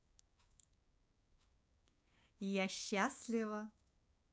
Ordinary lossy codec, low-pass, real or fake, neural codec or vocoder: none; none; fake; codec, 16 kHz, 6 kbps, DAC